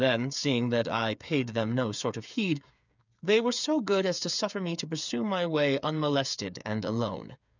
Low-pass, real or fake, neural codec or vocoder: 7.2 kHz; fake; codec, 16 kHz, 8 kbps, FreqCodec, smaller model